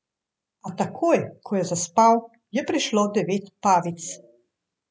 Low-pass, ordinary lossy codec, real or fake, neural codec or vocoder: none; none; real; none